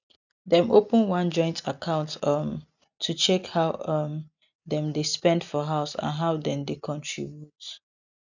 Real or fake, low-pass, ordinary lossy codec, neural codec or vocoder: real; 7.2 kHz; none; none